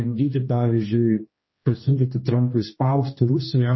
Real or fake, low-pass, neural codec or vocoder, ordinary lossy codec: fake; 7.2 kHz; codec, 16 kHz, 1 kbps, X-Codec, HuBERT features, trained on general audio; MP3, 24 kbps